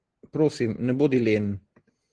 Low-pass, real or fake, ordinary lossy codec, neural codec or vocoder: 9.9 kHz; real; Opus, 16 kbps; none